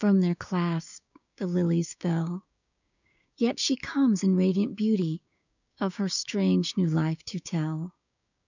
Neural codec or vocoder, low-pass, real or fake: codec, 16 kHz, 6 kbps, DAC; 7.2 kHz; fake